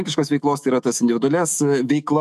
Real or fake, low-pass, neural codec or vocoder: fake; 14.4 kHz; autoencoder, 48 kHz, 128 numbers a frame, DAC-VAE, trained on Japanese speech